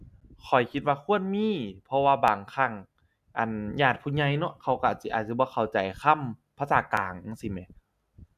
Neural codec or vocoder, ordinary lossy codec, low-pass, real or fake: none; none; 14.4 kHz; real